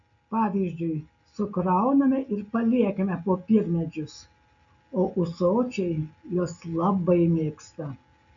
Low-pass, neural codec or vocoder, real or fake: 7.2 kHz; none; real